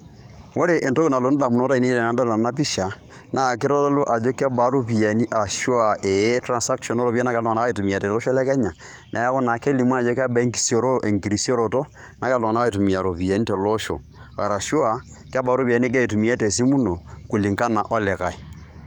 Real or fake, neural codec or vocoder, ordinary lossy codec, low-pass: fake; codec, 44.1 kHz, 7.8 kbps, DAC; none; 19.8 kHz